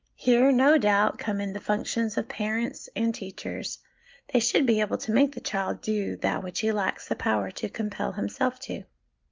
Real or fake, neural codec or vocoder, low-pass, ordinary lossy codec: real; none; 7.2 kHz; Opus, 24 kbps